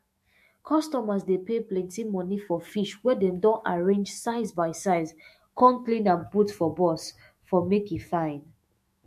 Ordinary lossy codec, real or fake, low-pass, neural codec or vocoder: MP3, 64 kbps; fake; 14.4 kHz; autoencoder, 48 kHz, 128 numbers a frame, DAC-VAE, trained on Japanese speech